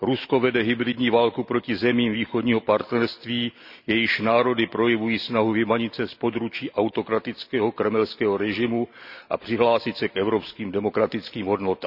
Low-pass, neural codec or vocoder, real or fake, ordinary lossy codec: 5.4 kHz; none; real; none